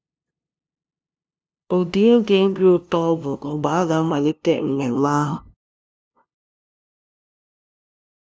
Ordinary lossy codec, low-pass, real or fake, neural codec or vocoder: none; none; fake; codec, 16 kHz, 0.5 kbps, FunCodec, trained on LibriTTS, 25 frames a second